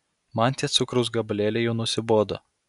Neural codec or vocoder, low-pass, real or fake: none; 10.8 kHz; real